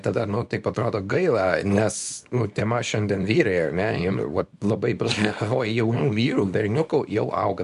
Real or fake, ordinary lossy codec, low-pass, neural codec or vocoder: fake; MP3, 64 kbps; 10.8 kHz; codec, 24 kHz, 0.9 kbps, WavTokenizer, small release